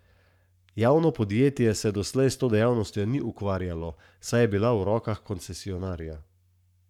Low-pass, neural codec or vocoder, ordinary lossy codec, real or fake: 19.8 kHz; codec, 44.1 kHz, 7.8 kbps, Pupu-Codec; none; fake